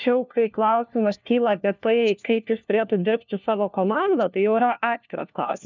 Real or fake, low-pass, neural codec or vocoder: fake; 7.2 kHz; codec, 16 kHz, 1 kbps, FunCodec, trained on LibriTTS, 50 frames a second